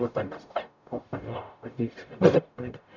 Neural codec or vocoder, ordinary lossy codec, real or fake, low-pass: codec, 44.1 kHz, 0.9 kbps, DAC; none; fake; 7.2 kHz